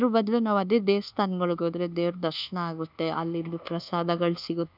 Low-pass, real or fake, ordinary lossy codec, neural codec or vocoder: 5.4 kHz; fake; none; codec, 24 kHz, 1.2 kbps, DualCodec